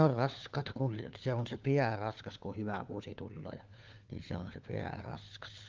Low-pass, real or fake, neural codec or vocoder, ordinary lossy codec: 7.2 kHz; fake; codec, 16 kHz, 4 kbps, FunCodec, trained on LibriTTS, 50 frames a second; Opus, 24 kbps